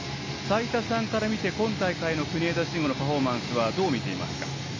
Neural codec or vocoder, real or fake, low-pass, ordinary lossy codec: none; real; 7.2 kHz; AAC, 32 kbps